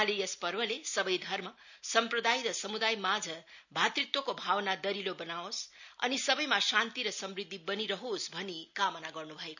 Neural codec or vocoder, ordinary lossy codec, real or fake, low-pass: none; none; real; 7.2 kHz